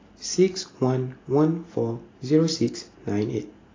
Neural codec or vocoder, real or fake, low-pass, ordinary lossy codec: none; real; 7.2 kHz; AAC, 32 kbps